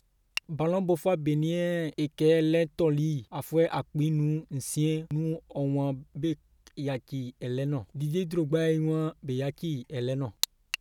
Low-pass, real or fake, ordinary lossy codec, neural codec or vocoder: 19.8 kHz; real; none; none